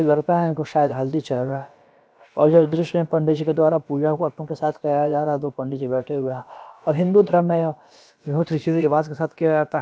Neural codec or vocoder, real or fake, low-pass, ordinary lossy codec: codec, 16 kHz, about 1 kbps, DyCAST, with the encoder's durations; fake; none; none